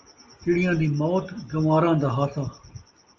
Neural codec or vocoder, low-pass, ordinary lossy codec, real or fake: none; 7.2 kHz; Opus, 24 kbps; real